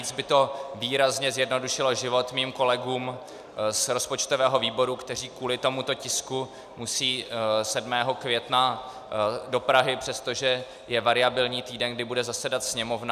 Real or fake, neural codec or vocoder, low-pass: real; none; 14.4 kHz